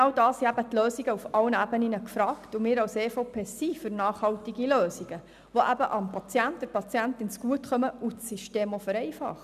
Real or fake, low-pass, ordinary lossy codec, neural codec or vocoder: real; 14.4 kHz; none; none